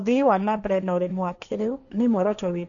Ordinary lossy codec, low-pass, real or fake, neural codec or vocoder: none; 7.2 kHz; fake; codec, 16 kHz, 1.1 kbps, Voila-Tokenizer